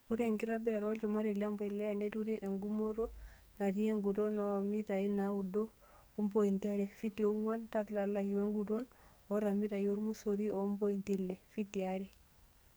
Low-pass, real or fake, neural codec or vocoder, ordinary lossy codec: none; fake; codec, 44.1 kHz, 2.6 kbps, SNAC; none